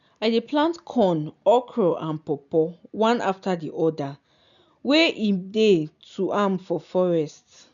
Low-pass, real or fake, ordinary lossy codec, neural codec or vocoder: 7.2 kHz; real; none; none